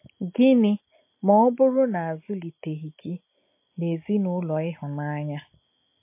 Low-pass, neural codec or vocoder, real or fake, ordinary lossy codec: 3.6 kHz; none; real; MP3, 24 kbps